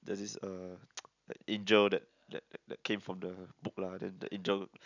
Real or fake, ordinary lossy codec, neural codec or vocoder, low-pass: real; none; none; 7.2 kHz